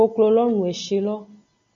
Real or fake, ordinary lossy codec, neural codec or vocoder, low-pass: real; AAC, 64 kbps; none; 7.2 kHz